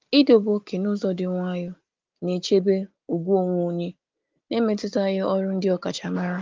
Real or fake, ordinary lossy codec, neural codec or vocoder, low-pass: real; Opus, 32 kbps; none; 7.2 kHz